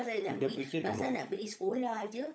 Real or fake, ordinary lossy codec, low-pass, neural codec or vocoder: fake; none; none; codec, 16 kHz, 16 kbps, FunCodec, trained on LibriTTS, 50 frames a second